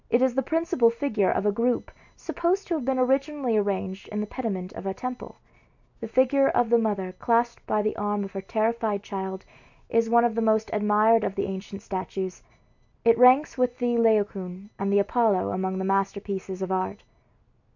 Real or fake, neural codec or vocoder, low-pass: real; none; 7.2 kHz